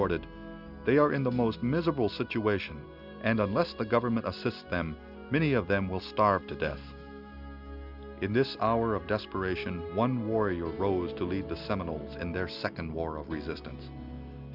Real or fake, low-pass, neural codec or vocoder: real; 5.4 kHz; none